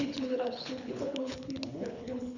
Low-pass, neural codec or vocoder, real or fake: 7.2 kHz; codec, 24 kHz, 6 kbps, HILCodec; fake